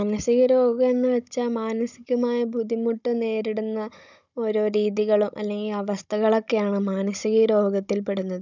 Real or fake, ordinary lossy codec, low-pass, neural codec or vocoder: fake; none; 7.2 kHz; codec, 16 kHz, 16 kbps, FunCodec, trained on Chinese and English, 50 frames a second